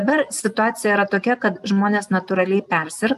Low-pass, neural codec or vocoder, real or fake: 14.4 kHz; none; real